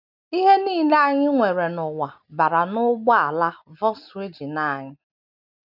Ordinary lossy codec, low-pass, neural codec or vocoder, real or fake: none; 5.4 kHz; none; real